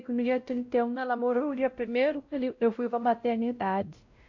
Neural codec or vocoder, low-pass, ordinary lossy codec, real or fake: codec, 16 kHz, 0.5 kbps, X-Codec, WavLM features, trained on Multilingual LibriSpeech; 7.2 kHz; AAC, 48 kbps; fake